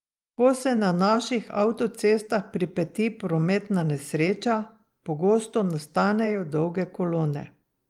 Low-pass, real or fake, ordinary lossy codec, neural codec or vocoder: 19.8 kHz; fake; Opus, 32 kbps; vocoder, 44.1 kHz, 128 mel bands every 512 samples, BigVGAN v2